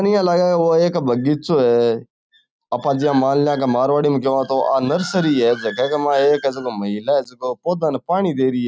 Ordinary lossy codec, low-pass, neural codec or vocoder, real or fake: none; none; none; real